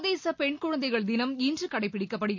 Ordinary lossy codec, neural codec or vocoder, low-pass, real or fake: MP3, 48 kbps; none; 7.2 kHz; real